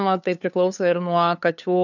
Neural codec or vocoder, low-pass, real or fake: codec, 16 kHz, 2 kbps, FunCodec, trained on LibriTTS, 25 frames a second; 7.2 kHz; fake